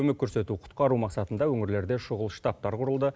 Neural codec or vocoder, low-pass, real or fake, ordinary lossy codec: none; none; real; none